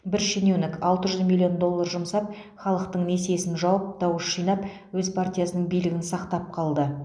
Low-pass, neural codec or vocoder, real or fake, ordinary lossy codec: 9.9 kHz; none; real; none